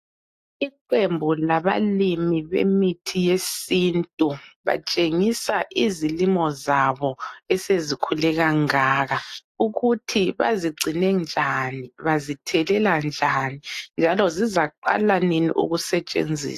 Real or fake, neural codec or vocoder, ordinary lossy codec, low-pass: real; none; AAC, 64 kbps; 14.4 kHz